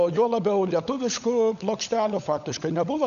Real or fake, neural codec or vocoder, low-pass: fake; codec, 16 kHz, 16 kbps, FunCodec, trained on LibriTTS, 50 frames a second; 7.2 kHz